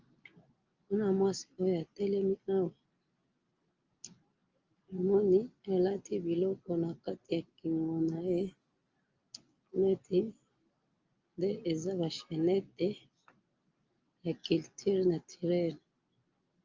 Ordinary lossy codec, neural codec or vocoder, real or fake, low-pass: Opus, 24 kbps; none; real; 7.2 kHz